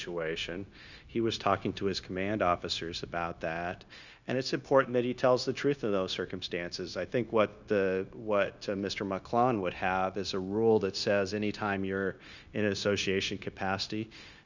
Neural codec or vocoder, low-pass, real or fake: codec, 16 kHz, 0.9 kbps, LongCat-Audio-Codec; 7.2 kHz; fake